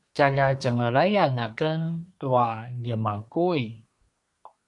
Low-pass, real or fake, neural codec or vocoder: 10.8 kHz; fake; codec, 24 kHz, 1 kbps, SNAC